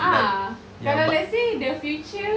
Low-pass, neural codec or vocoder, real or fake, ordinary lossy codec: none; none; real; none